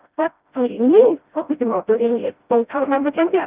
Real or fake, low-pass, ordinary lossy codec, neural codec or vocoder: fake; 3.6 kHz; Opus, 24 kbps; codec, 16 kHz, 0.5 kbps, FreqCodec, smaller model